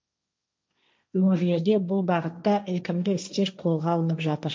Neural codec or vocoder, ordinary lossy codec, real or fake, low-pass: codec, 16 kHz, 1.1 kbps, Voila-Tokenizer; none; fake; none